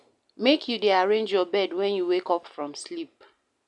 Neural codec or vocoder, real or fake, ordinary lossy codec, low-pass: none; real; none; 10.8 kHz